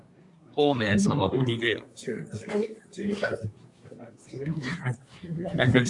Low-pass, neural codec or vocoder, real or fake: 10.8 kHz; codec, 24 kHz, 1 kbps, SNAC; fake